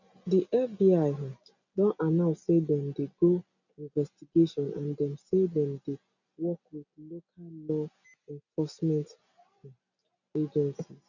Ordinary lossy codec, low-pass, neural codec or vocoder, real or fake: none; 7.2 kHz; none; real